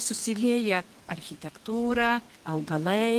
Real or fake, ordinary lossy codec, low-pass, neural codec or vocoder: fake; Opus, 32 kbps; 14.4 kHz; codec, 32 kHz, 1.9 kbps, SNAC